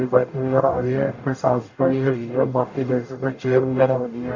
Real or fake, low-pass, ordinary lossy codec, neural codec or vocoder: fake; 7.2 kHz; AAC, 48 kbps; codec, 44.1 kHz, 0.9 kbps, DAC